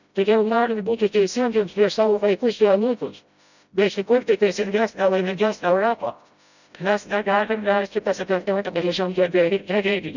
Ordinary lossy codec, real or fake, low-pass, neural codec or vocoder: none; fake; 7.2 kHz; codec, 16 kHz, 0.5 kbps, FreqCodec, smaller model